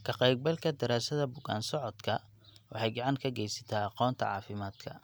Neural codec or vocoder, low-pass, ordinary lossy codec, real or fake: none; none; none; real